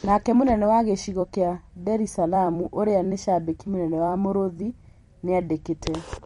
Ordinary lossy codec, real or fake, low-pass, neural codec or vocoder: MP3, 48 kbps; fake; 19.8 kHz; vocoder, 44.1 kHz, 128 mel bands every 256 samples, BigVGAN v2